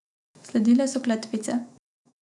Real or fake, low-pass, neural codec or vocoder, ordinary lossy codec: fake; 10.8 kHz; autoencoder, 48 kHz, 128 numbers a frame, DAC-VAE, trained on Japanese speech; none